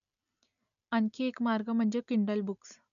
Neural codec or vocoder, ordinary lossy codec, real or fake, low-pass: none; MP3, 96 kbps; real; 7.2 kHz